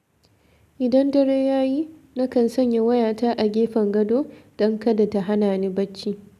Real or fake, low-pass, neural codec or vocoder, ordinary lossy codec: real; 14.4 kHz; none; none